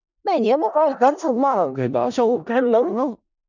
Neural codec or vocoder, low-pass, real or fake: codec, 16 kHz in and 24 kHz out, 0.4 kbps, LongCat-Audio-Codec, four codebook decoder; 7.2 kHz; fake